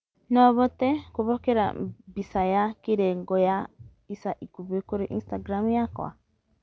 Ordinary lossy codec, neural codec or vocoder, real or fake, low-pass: none; none; real; none